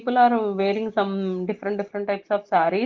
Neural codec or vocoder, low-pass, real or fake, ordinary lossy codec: none; 7.2 kHz; real; Opus, 16 kbps